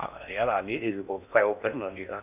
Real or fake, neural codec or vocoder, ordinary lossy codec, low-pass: fake; codec, 16 kHz in and 24 kHz out, 0.8 kbps, FocalCodec, streaming, 65536 codes; none; 3.6 kHz